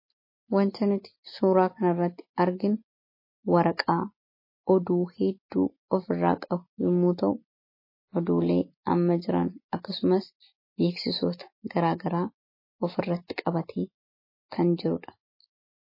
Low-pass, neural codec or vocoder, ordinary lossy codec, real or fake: 5.4 kHz; none; MP3, 24 kbps; real